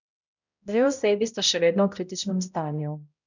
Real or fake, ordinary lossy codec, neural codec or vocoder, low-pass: fake; none; codec, 16 kHz, 0.5 kbps, X-Codec, HuBERT features, trained on balanced general audio; 7.2 kHz